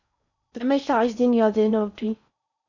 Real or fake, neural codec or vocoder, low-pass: fake; codec, 16 kHz in and 24 kHz out, 0.6 kbps, FocalCodec, streaming, 4096 codes; 7.2 kHz